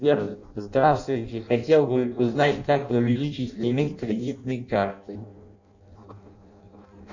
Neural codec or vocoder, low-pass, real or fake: codec, 16 kHz in and 24 kHz out, 0.6 kbps, FireRedTTS-2 codec; 7.2 kHz; fake